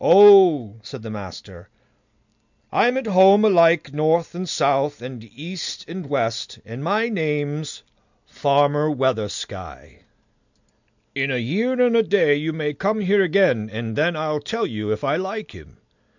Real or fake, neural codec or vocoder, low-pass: real; none; 7.2 kHz